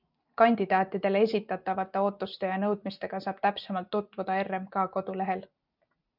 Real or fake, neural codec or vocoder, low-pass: real; none; 5.4 kHz